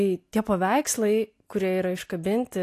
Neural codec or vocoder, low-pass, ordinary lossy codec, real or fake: none; 14.4 kHz; AAC, 64 kbps; real